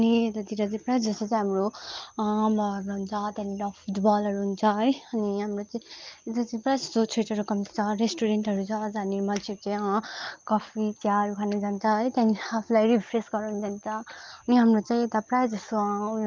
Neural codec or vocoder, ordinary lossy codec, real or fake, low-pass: none; Opus, 32 kbps; real; 7.2 kHz